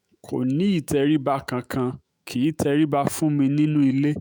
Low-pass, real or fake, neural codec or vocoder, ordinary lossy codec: none; fake; autoencoder, 48 kHz, 128 numbers a frame, DAC-VAE, trained on Japanese speech; none